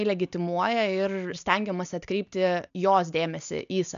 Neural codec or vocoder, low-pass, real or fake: none; 7.2 kHz; real